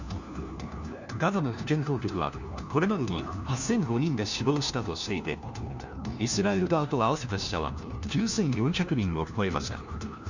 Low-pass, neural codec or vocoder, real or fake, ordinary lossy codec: 7.2 kHz; codec, 16 kHz, 1 kbps, FunCodec, trained on LibriTTS, 50 frames a second; fake; none